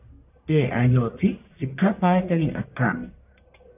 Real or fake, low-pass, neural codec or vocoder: fake; 3.6 kHz; codec, 44.1 kHz, 1.7 kbps, Pupu-Codec